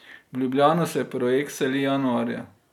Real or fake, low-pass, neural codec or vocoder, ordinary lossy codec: real; 19.8 kHz; none; none